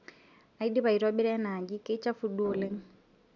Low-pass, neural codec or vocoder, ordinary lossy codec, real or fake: 7.2 kHz; none; none; real